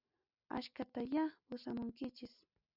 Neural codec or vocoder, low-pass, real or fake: none; 5.4 kHz; real